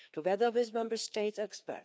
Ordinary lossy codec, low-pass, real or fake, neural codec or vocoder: none; none; fake; codec, 16 kHz, 2 kbps, FreqCodec, larger model